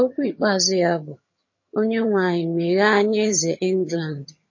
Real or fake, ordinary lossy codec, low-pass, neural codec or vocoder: fake; MP3, 32 kbps; 7.2 kHz; vocoder, 22.05 kHz, 80 mel bands, HiFi-GAN